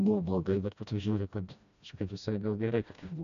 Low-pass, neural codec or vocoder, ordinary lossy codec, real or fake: 7.2 kHz; codec, 16 kHz, 1 kbps, FreqCodec, smaller model; MP3, 96 kbps; fake